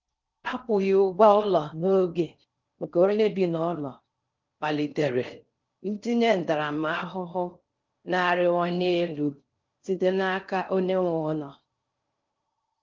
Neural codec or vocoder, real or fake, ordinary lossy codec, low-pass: codec, 16 kHz in and 24 kHz out, 0.6 kbps, FocalCodec, streaming, 4096 codes; fake; Opus, 32 kbps; 7.2 kHz